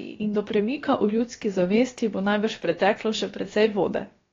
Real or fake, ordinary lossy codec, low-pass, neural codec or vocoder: fake; AAC, 32 kbps; 7.2 kHz; codec, 16 kHz, about 1 kbps, DyCAST, with the encoder's durations